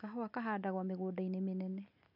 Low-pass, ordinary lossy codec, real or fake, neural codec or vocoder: 5.4 kHz; none; real; none